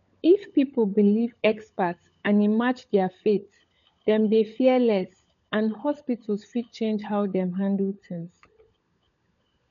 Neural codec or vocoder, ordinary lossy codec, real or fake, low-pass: codec, 16 kHz, 16 kbps, FunCodec, trained on LibriTTS, 50 frames a second; none; fake; 7.2 kHz